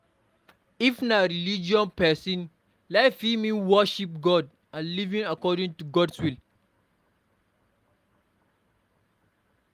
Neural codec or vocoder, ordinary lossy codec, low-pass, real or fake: none; Opus, 32 kbps; 14.4 kHz; real